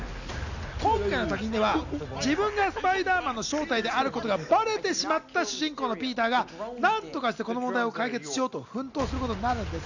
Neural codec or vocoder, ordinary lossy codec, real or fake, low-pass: none; none; real; 7.2 kHz